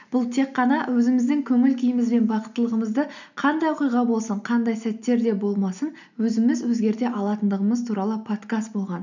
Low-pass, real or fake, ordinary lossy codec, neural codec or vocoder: 7.2 kHz; real; none; none